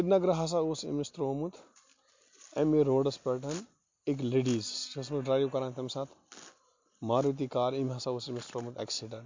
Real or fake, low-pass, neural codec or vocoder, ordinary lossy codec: real; 7.2 kHz; none; MP3, 48 kbps